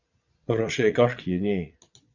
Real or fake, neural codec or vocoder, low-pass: fake; vocoder, 22.05 kHz, 80 mel bands, Vocos; 7.2 kHz